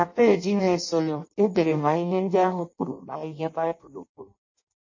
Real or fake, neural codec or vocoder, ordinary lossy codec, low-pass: fake; codec, 16 kHz in and 24 kHz out, 0.6 kbps, FireRedTTS-2 codec; MP3, 32 kbps; 7.2 kHz